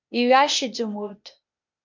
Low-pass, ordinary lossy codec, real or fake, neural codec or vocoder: 7.2 kHz; MP3, 64 kbps; fake; codec, 16 kHz, 0.8 kbps, ZipCodec